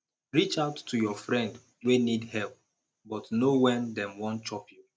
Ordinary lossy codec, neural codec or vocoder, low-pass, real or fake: none; none; none; real